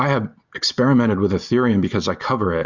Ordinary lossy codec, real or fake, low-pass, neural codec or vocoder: Opus, 64 kbps; real; 7.2 kHz; none